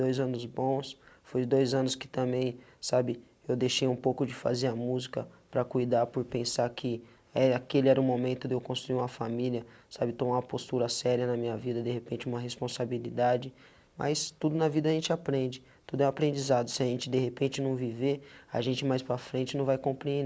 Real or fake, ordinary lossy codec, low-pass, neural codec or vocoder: real; none; none; none